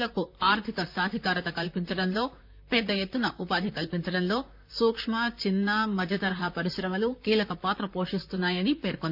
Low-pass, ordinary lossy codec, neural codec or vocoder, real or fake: 5.4 kHz; none; vocoder, 44.1 kHz, 128 mel bands, Pupu-Vocoder; fake